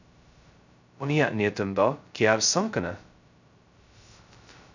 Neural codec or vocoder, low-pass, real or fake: codec, 16 kHz, 0.2 kbps, FocalCodec; 7.2 kHz; fake